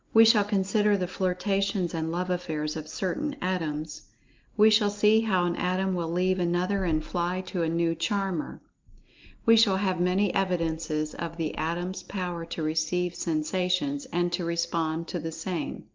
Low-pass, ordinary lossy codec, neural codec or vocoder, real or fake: 7.2 kHz; Opus, 24 kbps; none; real